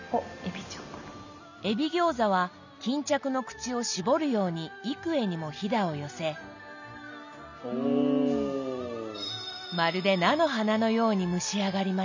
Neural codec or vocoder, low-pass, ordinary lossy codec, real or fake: none; 7.2 kHz; none; real